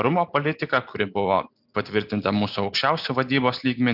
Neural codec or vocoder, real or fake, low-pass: none; real; 5.4 kHz